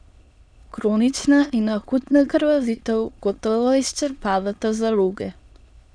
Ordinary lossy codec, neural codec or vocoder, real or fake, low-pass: none; autoencoder, 22.05 kHz, a latent of 192 numbers a frame, VITS, trained on many speakers; fake; 9.9 kHz